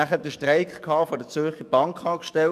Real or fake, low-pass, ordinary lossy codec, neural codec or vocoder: fake; 14.4 kHz; none; codec, 44.1 kHz, 7.8 kbps, DAC